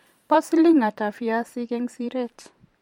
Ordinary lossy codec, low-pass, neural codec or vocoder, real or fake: MP3, 64 kbps; 19.8 kHz; vocoder, 44.1 kHz, 128 mel bands every 512 samples, BigVGAN v2; fake